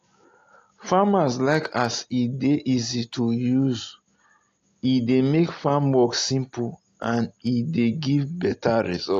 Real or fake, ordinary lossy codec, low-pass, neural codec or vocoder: real; AAC, 48 kbps; 7.2 kHz; none